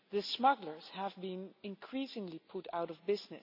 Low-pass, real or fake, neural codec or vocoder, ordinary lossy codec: 5.4 kHz; real; none; none